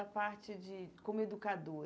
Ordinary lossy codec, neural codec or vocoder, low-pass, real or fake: none; none; none; real